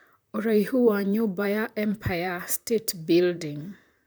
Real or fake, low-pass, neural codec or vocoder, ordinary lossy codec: fake; none; vocoder, 44.1 kHz, 128 mel bands, Pupu-Vocoder; none